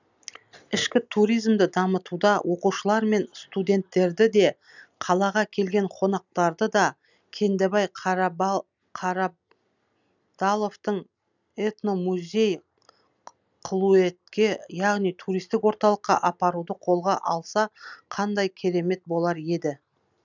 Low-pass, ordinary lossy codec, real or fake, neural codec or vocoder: 7.2 kHz; none; real; none